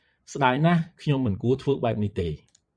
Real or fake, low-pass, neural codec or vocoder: fake; 9.9 kHz; vocoder, 22.05 kHz, 80 mel bands, Vocos